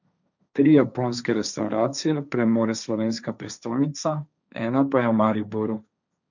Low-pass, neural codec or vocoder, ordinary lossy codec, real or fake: 7.2 kHz; codec, 16 kHz, 1.1 kbps, Voila-Tokenizer; none; fake